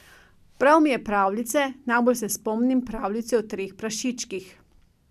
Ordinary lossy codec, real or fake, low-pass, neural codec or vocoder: none; real; 14.4 kHz; none